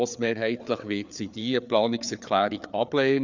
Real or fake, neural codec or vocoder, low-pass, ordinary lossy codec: fake; codec, 16 kHz, 4 kbps, FreqCodec, larger model; 7.2 kHz; Opus, 64 kbps